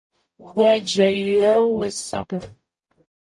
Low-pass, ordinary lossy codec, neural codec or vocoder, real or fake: 10.8 kHz; MP3, 48 kbps; codec, 44.1 kHz, 0.9 kbps, DAC; fake